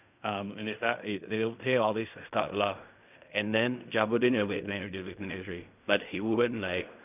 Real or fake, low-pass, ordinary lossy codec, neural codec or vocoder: fake; 3.6 kHz; none; codec, 16 kHz in and 24 kHz out, 0.4 kbps, LongCat-Audio-Codec, fine tuned four codebook decoder